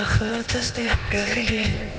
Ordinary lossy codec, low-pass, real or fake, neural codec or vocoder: none; none; fake; codec, 16 kHz, 0.8 kbps, ZipCodec